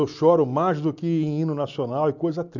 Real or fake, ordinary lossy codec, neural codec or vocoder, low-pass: real; none; none; 7.2 kHz